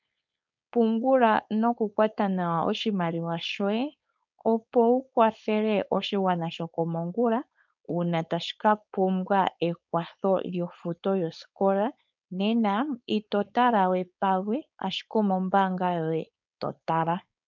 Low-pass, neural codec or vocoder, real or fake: 7.2 kHz; codec, 16 kHz, 4.8 kbps, FACodec; fake